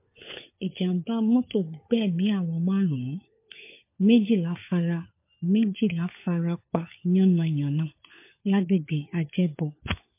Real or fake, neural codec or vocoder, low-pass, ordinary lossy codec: fake; codec, 16 kHz, 4 kbps, FunCodec, trained on LibriTTS, 50 frames a second; 3.6 kHz; MP3, 24 kbps